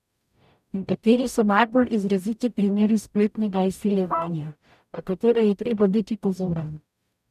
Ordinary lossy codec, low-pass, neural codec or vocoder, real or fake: none; 14.4 kHz; codec, 44.1 kHz, 0.9 kbps, DAC; fake